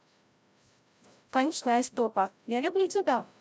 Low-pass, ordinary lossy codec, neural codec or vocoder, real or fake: none; none; codec, 16 kHz, 0.5 kbps, FreqCodec, larger model; fake